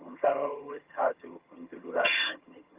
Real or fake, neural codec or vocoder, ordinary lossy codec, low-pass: fake; vocoder, 22.05 kHz, 80 mel bands, HiFi-GAN; Opus, 24 kbps; 3.6 kHz